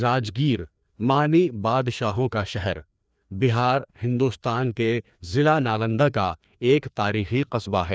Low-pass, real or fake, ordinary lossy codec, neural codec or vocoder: none; fake; none; codec, 16 kHz, 2 kbps, FreqCodec, larger model